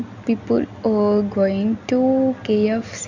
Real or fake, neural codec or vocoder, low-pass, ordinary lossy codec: real; none; 7.2 kHz; none